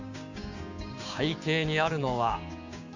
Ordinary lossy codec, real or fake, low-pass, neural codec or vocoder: Opus, 64 kbps; fake; 7.2 kHz; codec, 16 kHz, 6 kbps, DAC